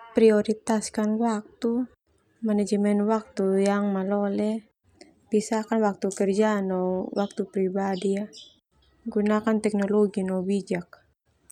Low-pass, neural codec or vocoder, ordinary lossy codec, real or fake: 19.8 kHz; none; none; real